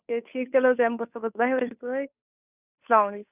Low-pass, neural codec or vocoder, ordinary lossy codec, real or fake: 3.6 kHz; codec, 16 kHz, 2 kbps, FunCodec, trained on Chinese and English, 25 frames a second; none; fake